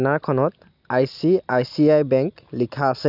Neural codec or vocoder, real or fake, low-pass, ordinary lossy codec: none; real; 5.4 kHz; none